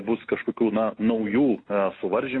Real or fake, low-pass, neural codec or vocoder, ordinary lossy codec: real; 9.9 kHz; none; AAC, 32 kbps